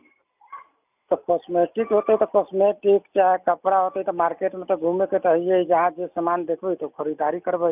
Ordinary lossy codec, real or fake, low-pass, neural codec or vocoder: none; real; 3.6 kHz; none